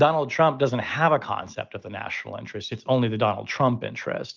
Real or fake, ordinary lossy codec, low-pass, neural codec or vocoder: real; Opus, 32 kbps; 7.2 kHz; none